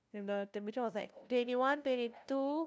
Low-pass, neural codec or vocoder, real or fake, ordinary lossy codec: none; codec, 16 kHz, 0.5 kbps, FunCodec, trained on LibriTTS, 25 frames a second; fake; none